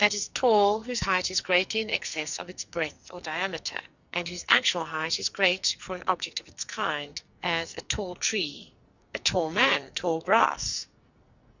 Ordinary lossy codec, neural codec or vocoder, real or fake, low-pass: Opus, 64 kbps; codec, 44.1 kHz, 2.6 kbps, SNAC; fake; 7.2 kHz